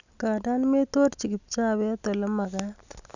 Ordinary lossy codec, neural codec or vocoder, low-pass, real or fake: none; none; 7.2 kHz; real